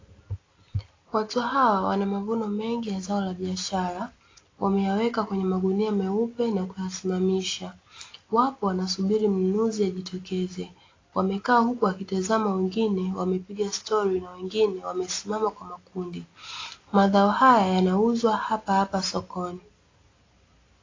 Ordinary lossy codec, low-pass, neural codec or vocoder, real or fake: AAC, 32 kbps; 7.2 kHz; none; real